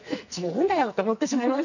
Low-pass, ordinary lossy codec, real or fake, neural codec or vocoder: 7.2 kHz; none; fake; codec, 44.1 kHz, 2.6 kbps, SNAC